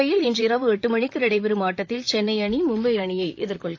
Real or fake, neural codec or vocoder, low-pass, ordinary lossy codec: fake; vocoder, 44.1 kHz, 128 mel bands, Pupu-Vocoder; 7.2 kHz; AAC, 48 kbps